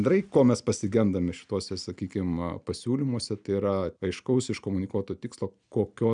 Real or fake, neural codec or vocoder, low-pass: real; none; 9.9 kHz